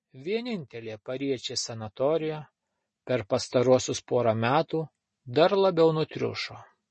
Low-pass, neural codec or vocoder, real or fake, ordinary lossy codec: 9.9 kHz; none; real; MP3, 32 kbps